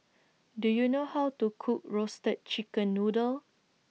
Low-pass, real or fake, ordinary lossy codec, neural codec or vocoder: none; real; none; none